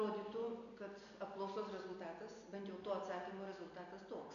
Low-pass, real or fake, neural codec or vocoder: 7.2 kHz; real; none